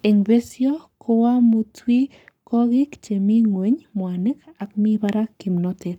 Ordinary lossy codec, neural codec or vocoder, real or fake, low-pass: none; codec, 44.1 kHz, 7.8 kbps, Pupu-Codec; fake; 19.8 kHz